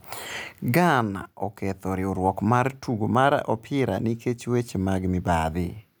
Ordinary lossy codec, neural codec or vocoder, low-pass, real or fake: none; none; none; real